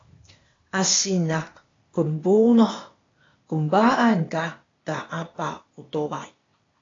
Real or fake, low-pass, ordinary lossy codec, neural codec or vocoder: fake; 7.2 kHz; AAC, 32 kbps; codec, 16 kHz, 0.8 kbps, ZipCodec